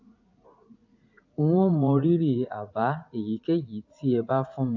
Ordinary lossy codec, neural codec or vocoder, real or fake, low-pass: AAC, 48 kbps; vocoder, 44.1 kHz, 80 mel bands, Vocos; fake; 7.2 kHz